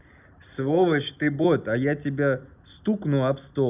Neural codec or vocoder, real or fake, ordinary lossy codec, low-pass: none; real; none; 3.6 kHz